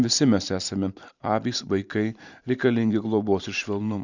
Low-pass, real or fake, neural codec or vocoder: 7.2 kHz; fake; vocoder, 44.1 kHz, 128 mel bands every 512 samples, BigVGAN v2